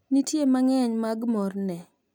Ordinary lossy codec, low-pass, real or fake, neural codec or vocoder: none; none; real; none